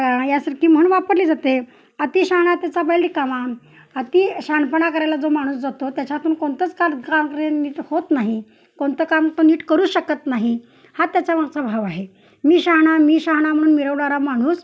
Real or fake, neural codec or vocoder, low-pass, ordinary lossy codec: real; none; none; none